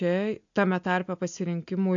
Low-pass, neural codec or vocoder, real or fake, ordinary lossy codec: 7.2 kHz; none; real; AAC, 64 kbps